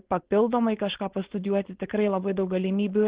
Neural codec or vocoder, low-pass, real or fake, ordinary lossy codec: codec, 16 kHz, 4.8 kbps, FACodec; 3.6 kHz; fake; Opus, 16 kbps